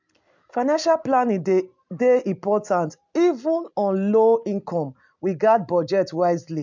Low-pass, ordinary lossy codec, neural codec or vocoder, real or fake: 7.2 kHz; MP3, 64 kbps; none; real